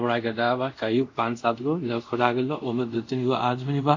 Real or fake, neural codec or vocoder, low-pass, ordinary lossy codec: fake; codec, 24 kHz, 0.5 kbps, DualCodec; 7.2 kHz; MP3, 48 kbps